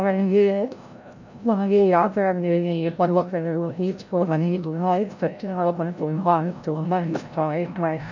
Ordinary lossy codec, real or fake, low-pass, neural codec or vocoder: none; fake; 7.2 kHz; codec, 16 kHz, 0.5 kbps, FreqCodec, larger model